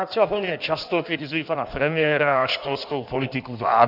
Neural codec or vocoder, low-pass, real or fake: codec, 16 kHz in and 24 kHz out, 1.1 kbps, FireRedTTS-2 codec; 5.4 kHz; fake